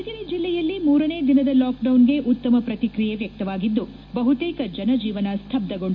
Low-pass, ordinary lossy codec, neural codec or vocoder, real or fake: 7.2 kHz; MP3, 48 kbps; none; real